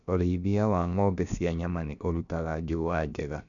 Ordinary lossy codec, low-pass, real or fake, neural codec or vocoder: none; 7.2 kHz; fake; codec, 16 kHz, about 1 kbps, DyCAST, with the encoder's durations